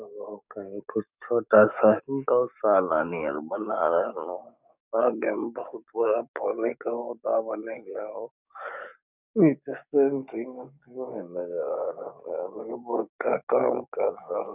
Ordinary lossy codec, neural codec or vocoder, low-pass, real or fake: none; codec, 44.1 kHz, 7.8 kbps, DAC; 3.6 kHz; fake